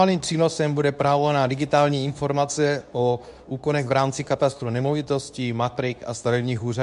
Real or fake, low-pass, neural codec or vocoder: fake; 10.8 kHz; codec, 24 kHz, 0.9 kbps, WavTokenizer, medium speech release version 2